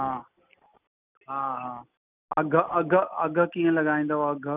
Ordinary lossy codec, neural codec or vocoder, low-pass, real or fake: none; none; 3.6 kHz; real